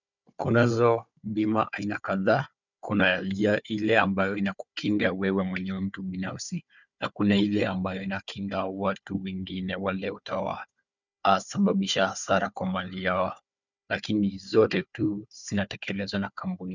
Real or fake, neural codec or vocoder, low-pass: fake; codec, 16 kHz, 4 kbps, FunCodec, trained on Chinese and English, 50 frames a second; 7.2 kHz